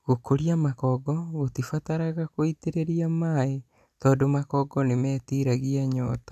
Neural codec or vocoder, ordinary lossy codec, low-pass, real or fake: none; none; 14.4 kHz; real